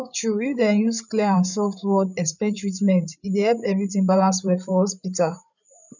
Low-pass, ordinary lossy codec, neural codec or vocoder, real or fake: 7.2 kHz; none; codec, 16 kHz, 8 kbps, FreqCodec, larger model; fake